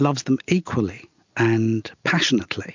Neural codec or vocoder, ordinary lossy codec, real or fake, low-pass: none; MP3, 64 kbps; real; 7.2 kHz